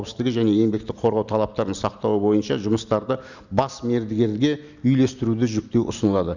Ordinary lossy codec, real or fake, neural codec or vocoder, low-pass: none; real; none; 7.2 kHz